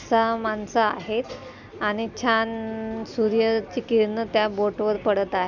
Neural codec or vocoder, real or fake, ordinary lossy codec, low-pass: none; real; none; 7.2 kHz